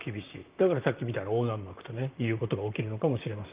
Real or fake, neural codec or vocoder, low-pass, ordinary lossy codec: fake; vocoder, 44.1 kHz, 128 mel bands, Pupu-Vocoder; 3.6 kHz; Opus, 32 kbps